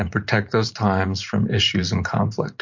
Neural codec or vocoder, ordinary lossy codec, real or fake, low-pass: vocoder, 44.1 kHz, 128 mel bands every 512 samples, BigVGAN v2; MP3, 48 kbps; fake; 7.2 kHz